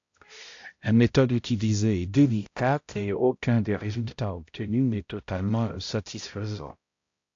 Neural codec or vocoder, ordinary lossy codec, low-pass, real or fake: codec, 16 kHz, 0.5 kbps, X-Codec, HuBERT features, trained on balanced general audio; AAC, 48 kbps; 7.2 kHz; fake